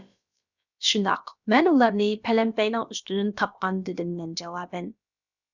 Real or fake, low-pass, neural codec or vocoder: fake; 7.2 kHz; codec, 16 kHz, about 1 kbps, DyCAST, with the encoder's durations